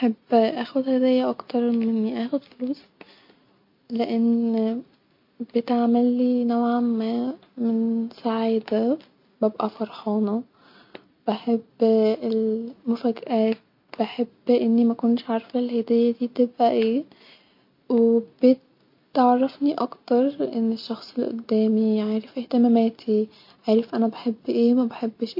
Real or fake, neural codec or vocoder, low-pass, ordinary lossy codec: real; none; 5.4 kHz; MP3, 32 kbps